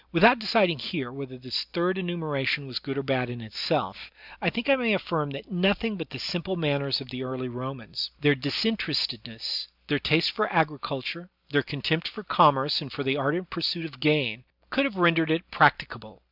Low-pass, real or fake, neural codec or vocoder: 5.4 kHz; real; none